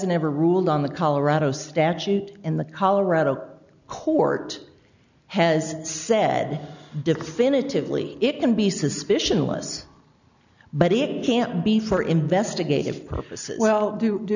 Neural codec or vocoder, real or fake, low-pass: none; real; 7.2 kHz